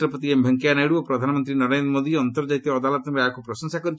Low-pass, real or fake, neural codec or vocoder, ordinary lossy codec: none; real; none; none